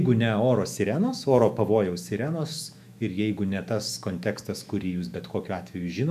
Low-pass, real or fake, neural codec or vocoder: 14.4 kHz; fake; autoencoder, 48 kHz, 128 numbers a frame, DAC-VAE, trained on Japanese speech